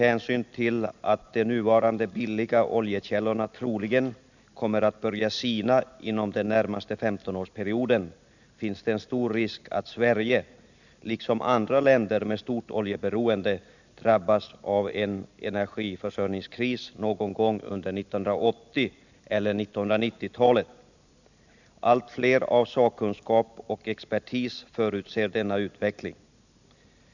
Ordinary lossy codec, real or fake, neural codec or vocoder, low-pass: none; real; none; 7.2 kHz